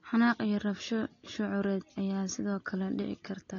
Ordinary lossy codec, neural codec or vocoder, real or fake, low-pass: AAC, 32 kbps; none; real; 7.2 kHz